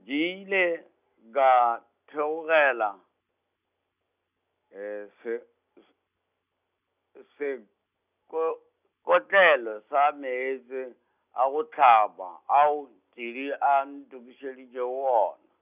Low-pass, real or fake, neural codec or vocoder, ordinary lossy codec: 3.6 kHz; real; none; none